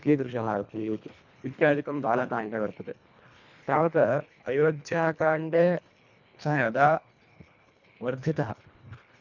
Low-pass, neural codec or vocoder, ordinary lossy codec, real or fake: 7.2 kHz; codec, 24 kHz, 1.5 kbps, HILCodec; none; fake